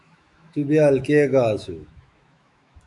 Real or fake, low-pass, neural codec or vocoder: fake; 10.8 kHz; autoencoder, 48 kHz, 128 numbers a frame, DAC-VAE, trained on Japanese speech